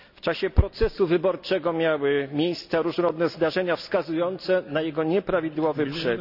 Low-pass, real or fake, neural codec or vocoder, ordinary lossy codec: 5.4 kHz; real; none; none